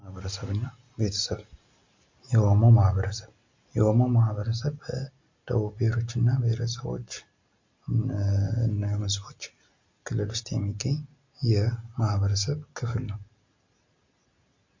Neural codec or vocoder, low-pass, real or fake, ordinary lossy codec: none; 7.2 kHz; real; MP3, 48 kbps